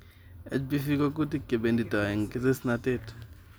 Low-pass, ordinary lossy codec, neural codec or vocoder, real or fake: none; none; none; real